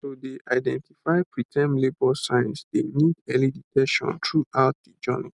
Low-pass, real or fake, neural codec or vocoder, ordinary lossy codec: 10.8 kHz; real; none; none